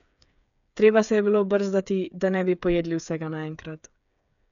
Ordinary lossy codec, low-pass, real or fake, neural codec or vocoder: none; 7.2 kHz; fake; codec, 16 kHz, 8 kbps, FreqCodec, smaller model